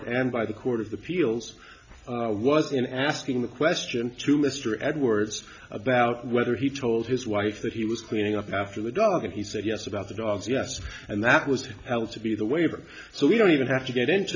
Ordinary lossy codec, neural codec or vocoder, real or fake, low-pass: MP3, 64 kbps; none; real; 7.2 kHz